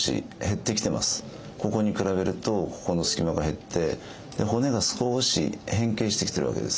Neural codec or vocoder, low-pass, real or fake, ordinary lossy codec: none; none; real; none